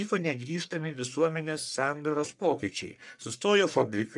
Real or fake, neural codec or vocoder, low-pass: fake; codec, 44.1 kHz, 1.7 kbps, Pupu-Codec; 10.8 kHz